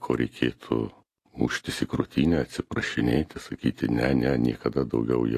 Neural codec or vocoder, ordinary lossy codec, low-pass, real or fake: none; AAC, 48 kbps; 14.4 kHz; real